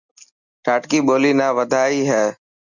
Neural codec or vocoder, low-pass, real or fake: none; 7.2 kHz; real